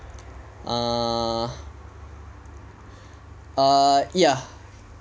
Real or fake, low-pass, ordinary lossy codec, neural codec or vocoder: real; none; none; none